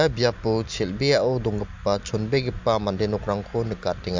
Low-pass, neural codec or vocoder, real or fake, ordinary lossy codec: 7.2 kHz; none; real; MP3, 64 kbps